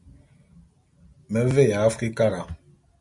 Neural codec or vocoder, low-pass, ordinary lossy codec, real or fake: none; 10.8 kHz; MP3, 64 kbps; real